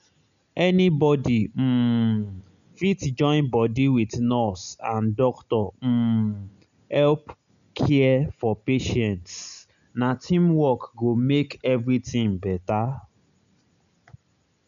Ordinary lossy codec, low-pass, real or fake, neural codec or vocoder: none; 7.2 kHz; real; none